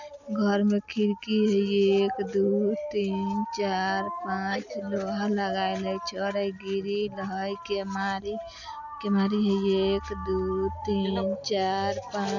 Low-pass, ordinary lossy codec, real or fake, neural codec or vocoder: 7.2 kHz; none; real; none